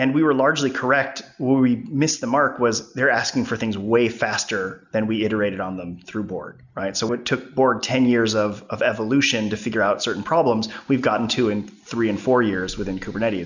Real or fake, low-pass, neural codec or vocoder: real; 7.2 kHz; none